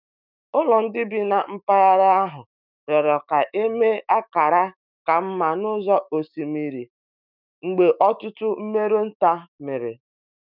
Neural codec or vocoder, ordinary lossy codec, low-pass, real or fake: autoencoder, 48 kHz, 128 numbers a frame, DAC-VAE, trained on Japanese speech; none; 5.4 kHz; fake